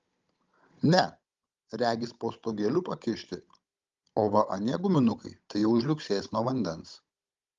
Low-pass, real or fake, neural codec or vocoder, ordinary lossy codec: 7.2 kHz; fake; codec, 16 kHz, 16 kbps, FunCodec, trained on Chinese and English, 50 frames a second; Opus, 32 kbps